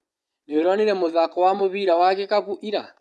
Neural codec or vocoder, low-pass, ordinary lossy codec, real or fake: vocoder, 24 kHz, 100 mel bands, Vocos; none; none; fake